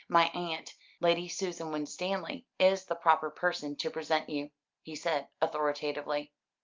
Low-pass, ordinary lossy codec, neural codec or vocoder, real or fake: 7.2 kHz; Opus, 24 kbps; none; real